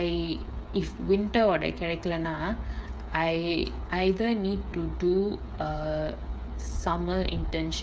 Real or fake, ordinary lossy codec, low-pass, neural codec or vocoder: fake; none; none; codec, 16 kHz, 8 kbps, FreqCodec, smaller model